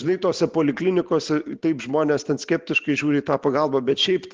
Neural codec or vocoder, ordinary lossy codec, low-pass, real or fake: none; Opus, 16 kbps; 7.2 kHz; real